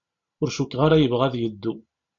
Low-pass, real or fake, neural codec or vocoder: 7.2 kHz; real; none